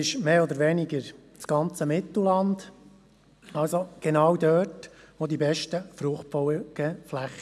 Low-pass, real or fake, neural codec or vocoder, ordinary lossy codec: none; real; none; none